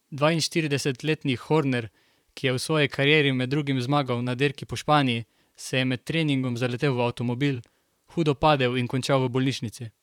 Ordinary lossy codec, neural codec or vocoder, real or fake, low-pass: none; vocoder, 44.1 kHz, 128 mel bands, Pupu-Vocoder; fake; 19.8 kHz